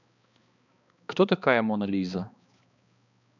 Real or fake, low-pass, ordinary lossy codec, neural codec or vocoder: fake; 7.2 kHz; none; codec, 16 kHz, 2 kbps, X-Codec, HuBERT features, trained on balanced general audio